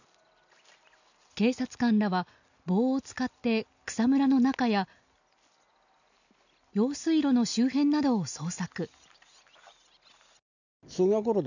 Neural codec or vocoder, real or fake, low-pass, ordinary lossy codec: none; real; 7.2 kHz; none